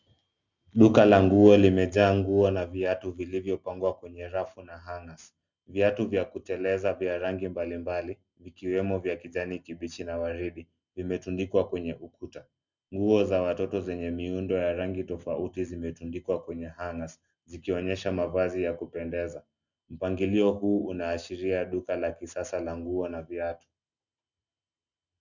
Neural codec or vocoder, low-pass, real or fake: none; 7.2 kHz; real